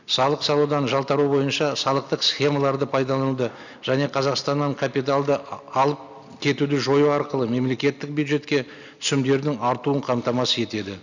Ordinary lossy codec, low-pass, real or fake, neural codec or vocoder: none; 7.2 kHz; real; none